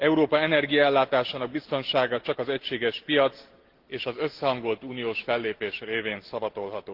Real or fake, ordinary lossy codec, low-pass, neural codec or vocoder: real; Opus, 16 kbps; 5.4 kHz; none